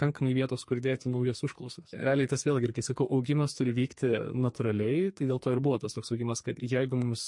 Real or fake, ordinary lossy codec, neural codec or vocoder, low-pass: fake; MP3, 48 kbps; codec, 44.1 kHz, 2.6 kbps, SNAC; 10.8 kHz